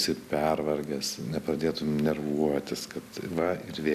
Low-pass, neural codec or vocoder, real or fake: 14.4 kHz; none; real